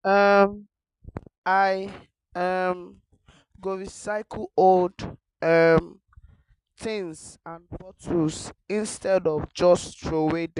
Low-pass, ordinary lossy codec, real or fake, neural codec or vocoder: 10.8 kHz; Opus, 64 kbps; real; none